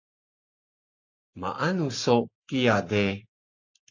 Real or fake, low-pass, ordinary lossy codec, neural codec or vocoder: fake; 7.2 kHz; AAC, 48 kbps; codec, 44.1 kHz, 7.8 kbps, Pupu-Codec